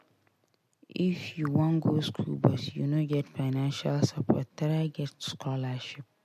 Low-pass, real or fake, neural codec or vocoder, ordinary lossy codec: 14.4 kHz; real; none; MP3, 64 kbps